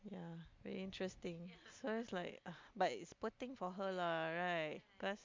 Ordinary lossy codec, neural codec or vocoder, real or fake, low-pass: MP3, 64 kbps; none; real; 7.2 kHz